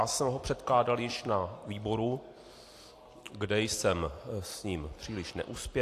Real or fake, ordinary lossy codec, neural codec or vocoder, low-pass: real; AAC, 64 kbps; none; 14.4 kHz